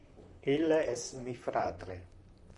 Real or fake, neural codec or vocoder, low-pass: fake; vocoder, 44.1 kHz, 128 mel bands, Pupu-Vocoder; 10.8 kHz